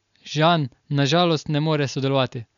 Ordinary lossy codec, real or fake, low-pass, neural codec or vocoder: none; real; 7.2 kHz; none